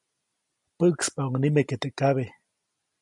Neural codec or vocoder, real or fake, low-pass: none; real; 10.8 kHz